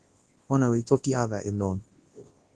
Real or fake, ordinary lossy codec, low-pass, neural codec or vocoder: fake; Opus, 16 kbps; 10.8 kHz; codec, 24 kHz, 0.9 kbps, WavTokenizer, large speech release